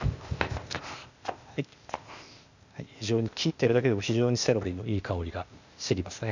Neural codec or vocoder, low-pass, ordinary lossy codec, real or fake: codec, 16 kHz, 0.8 kbps, ZipCodec; 7.2 kHz; none; fake